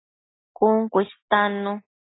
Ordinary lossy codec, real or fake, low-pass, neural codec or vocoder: AAC, 16 kbps; real; 7.2 kHz; none